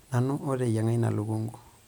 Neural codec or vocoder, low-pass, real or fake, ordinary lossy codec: none; none; real; none